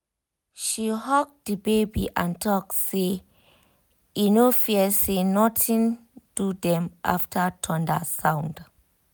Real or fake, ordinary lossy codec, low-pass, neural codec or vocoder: real; none; none; none